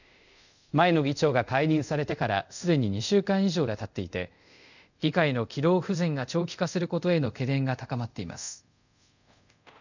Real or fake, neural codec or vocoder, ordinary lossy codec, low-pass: fake; codec, 24 kHz, 0.5 kbps, DualCodec; none; 7.2 kHz